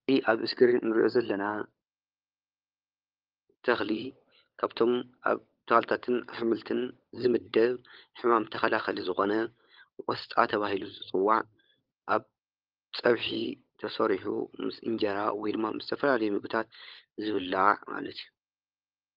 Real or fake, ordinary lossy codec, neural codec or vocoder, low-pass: fake; Opus, 24 kbps; codec, 16 kHz, 16 kbps, FunCodec, trained on LibriTTS, 50 frames a second; 5.4 kHz